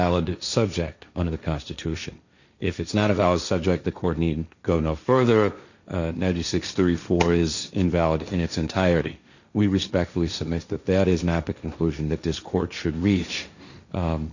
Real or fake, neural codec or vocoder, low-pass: fake; codec, 16 kHz, 1.1 kbps, Voila-Tokenizer; 7.2 kHz